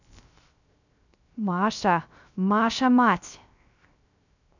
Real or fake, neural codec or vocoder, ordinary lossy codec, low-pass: fake; codec, 16 kHz, 0.3 kbps, FocalCodec; none; 7.2 kHz